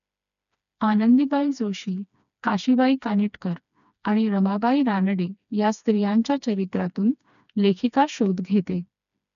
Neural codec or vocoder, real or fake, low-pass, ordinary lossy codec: codec, 16 kHz, 2 kbps, FreqCodec, smaller model; fake; 7.2 kHz; none